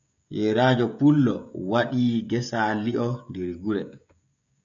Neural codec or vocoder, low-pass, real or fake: codec, 16 kHz, 16 kbps, FreqCodec, smaller model; 7.2 kHz; fake